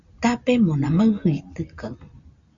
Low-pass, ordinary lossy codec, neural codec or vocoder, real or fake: 7.2 kHz; Opus, 64 kbps; none; real